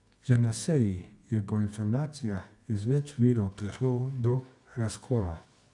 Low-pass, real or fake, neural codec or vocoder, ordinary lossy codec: 10.8 kHz; fake; codec, 24 kHz, 0.9 kbps, WavTokenizer, medium music audio release; none